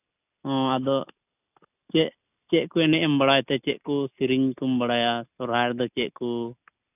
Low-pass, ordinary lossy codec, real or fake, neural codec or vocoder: 3.6 kHz; none; real; none